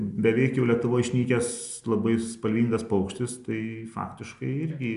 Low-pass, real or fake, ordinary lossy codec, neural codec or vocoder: 10.8 kHz; real; AAC, 96 kbps; none